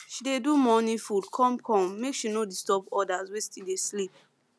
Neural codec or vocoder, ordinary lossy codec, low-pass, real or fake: none; none; none; real